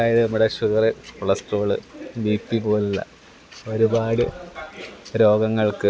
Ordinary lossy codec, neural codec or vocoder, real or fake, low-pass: none; none; real; none